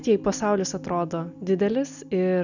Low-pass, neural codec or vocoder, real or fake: 7.2 kHz; none; real